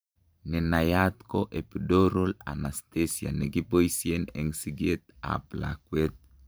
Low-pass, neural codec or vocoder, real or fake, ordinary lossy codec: none; vocoder, 44.1 kHz, 128 mel bands every 512 samples, BigVGAN v2; fake; none